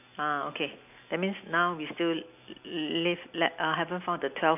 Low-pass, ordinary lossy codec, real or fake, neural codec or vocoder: 3.6 kHz; none; real; none